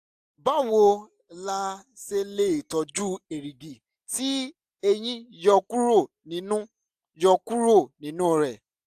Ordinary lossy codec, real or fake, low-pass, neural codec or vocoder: AAC, 96 kbps; real; 14.4 kHz; none